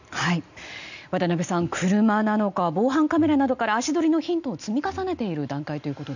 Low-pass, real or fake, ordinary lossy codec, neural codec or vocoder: 7.2 kHz; real; none; none